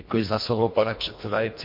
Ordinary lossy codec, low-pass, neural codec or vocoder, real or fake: MP3, 32 kbps; 5.4 kHz; codec, 24 kHz, 1.5 kbps, HILCodec; fake